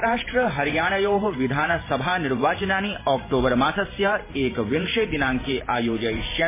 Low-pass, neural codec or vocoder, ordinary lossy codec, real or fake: 3.6 kHz; vocoder, 44.1 kHz, 128 mel bands every 512 samples, BigVGAN v2; MP3, 16 kbps; fake